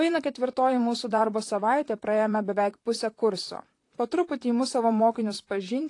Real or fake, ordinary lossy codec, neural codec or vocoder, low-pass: fake; AAC, 48 kbps; vocoder, 44.1 kHz, 128 mel bands, Pupu-Vocoder; 10.8 kHz